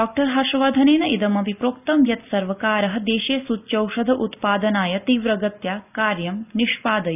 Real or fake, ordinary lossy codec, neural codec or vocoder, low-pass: real; none; none; 3.6 kHz